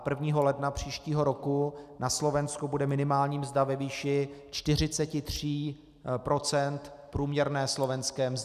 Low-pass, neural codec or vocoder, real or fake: 14.4 kHz; none; real